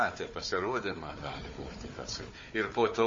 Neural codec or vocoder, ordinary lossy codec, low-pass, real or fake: codec, 16 kHz, 4 kbps, FunCodec, trained on Chinese and English, 50 frames a second; MP3, 32 kbps; 7.2 kHz; fake